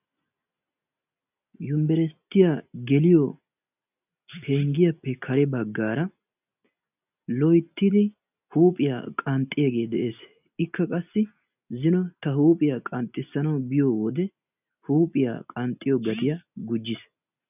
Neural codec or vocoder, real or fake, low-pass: none; real; 3.6 kHz